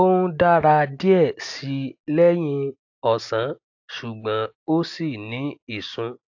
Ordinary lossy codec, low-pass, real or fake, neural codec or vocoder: AAC, 48 kbps; 7.2 kHz; real; none